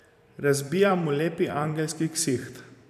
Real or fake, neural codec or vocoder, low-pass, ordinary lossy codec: fake; vocoder, 44.1 kHz, 128 mel bands every 256 samples, BigVGAN v2; 14.4 kHz; none